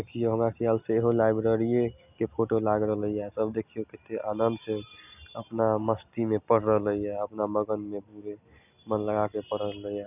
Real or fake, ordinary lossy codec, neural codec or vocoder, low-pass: real; none; none; 3.6 kHz